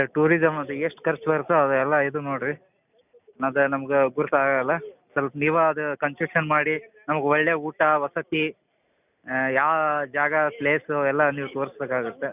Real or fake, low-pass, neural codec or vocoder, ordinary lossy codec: real; 3.6 kHz; none; none